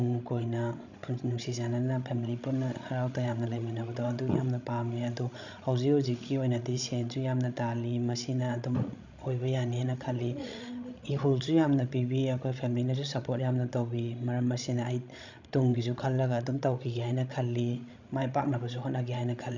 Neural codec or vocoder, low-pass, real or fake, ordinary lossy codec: codec, 16 kHz, 16 kbps, FreqCodec, larger model; 7.2 kHz; fake; none